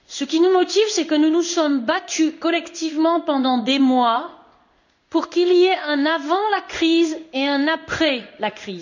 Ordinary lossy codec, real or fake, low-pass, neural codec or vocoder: none; fake; 7.2 kHz; codec, 16 kHz in and 24 kHz out, 1 kbps, XY-Tokenizer